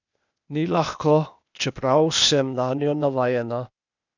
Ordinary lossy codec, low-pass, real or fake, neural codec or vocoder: none; 7.2 kHz; fake; codec, 16 kHz, 0.8 kbps, ZipCodec